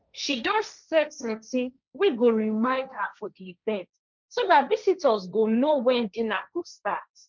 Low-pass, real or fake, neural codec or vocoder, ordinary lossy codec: 7.2 kHz; fake; codec, 16 kHz, 1.1 kbps, Voila-Tokenizer; none